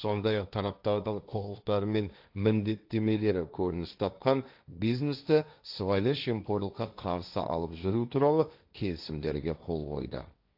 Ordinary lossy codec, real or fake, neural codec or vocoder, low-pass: AAC, 48 kbps; fake; codec, 16 kHz, 1.1 kbps, Voila-Tokenizer; 5.4 kHz